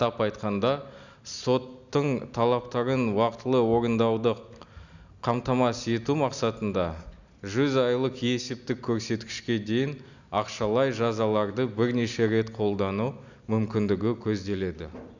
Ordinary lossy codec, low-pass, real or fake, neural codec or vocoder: none; 7.2 kHz; real; none